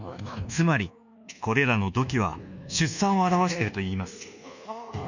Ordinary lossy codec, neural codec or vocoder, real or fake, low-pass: none; codec, 24 kHz, 1.2 kbps, DualCodec; fake; 7.2 kHz